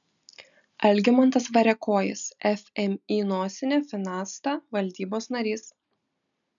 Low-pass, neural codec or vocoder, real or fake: 7.2 kHz; none; real